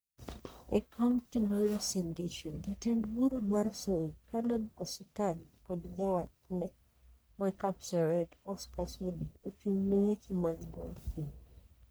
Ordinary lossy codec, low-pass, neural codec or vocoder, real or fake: none; none; codec, 44.1 kHz, 1.7 kbps, Pupu-Codec; fake